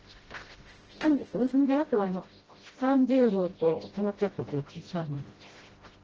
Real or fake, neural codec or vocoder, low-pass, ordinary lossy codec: fake; codec, 16 kHz, 0.5 kbps, FreqCodec, smaller model; 7.2 kHz; Opus, 16 kbps